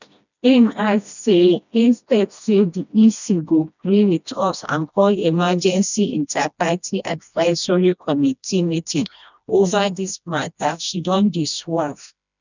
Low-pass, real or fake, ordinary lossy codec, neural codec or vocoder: 7.2 kHz; fake; none; codec, 16 kHz, 1 kbps, FreqCodec, smaller model